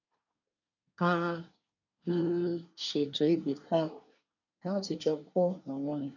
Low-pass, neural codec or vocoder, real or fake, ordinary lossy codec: 7.2 kHz; codec, 24 kHz, 1 kbps, SNAC; fake; none